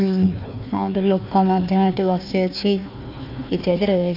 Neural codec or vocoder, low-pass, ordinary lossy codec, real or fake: codec, 16 kHz, 1 kbps, FunCodec, trained on Chinese and English, 50 frames a second; 5.4 kHz; none; fake